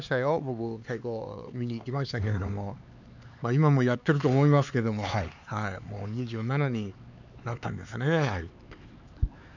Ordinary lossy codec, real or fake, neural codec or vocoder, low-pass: none; fake; codec, 16 kHz, 4 kbps, X-Codec, HuBERT features, trained on LibriSpeech; 7.2 kHz